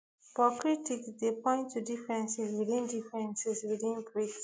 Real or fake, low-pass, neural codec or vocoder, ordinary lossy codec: real; none; none; none